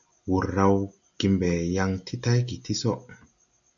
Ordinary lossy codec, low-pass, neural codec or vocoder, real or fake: AAC, 64 kbps; 7.2 kHz; none; real